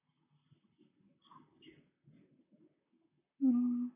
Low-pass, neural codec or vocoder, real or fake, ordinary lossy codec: 3.6 kHz; vocoder, 44.1 kHz, 128 mel bands, Pupu-Vocoder; fake; none